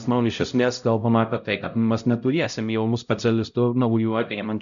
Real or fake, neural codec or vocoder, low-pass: fake; codec, 16 kHz, 0.5 kbps, X-Codec, HuBERT features, trained on LibriSpeech; 7.2 kHz